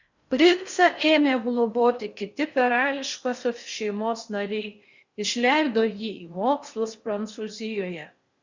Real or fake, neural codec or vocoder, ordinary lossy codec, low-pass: fake; codec, 16 kHz in and 24 kHz out, 0.6 kbps, FocalCodec, streaming, 4096 codes; Opus, 64 kbps; 7.2 kHz